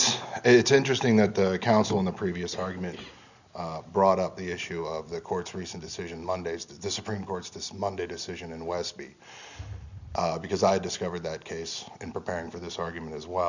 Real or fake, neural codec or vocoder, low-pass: real; none; 7.2 kHz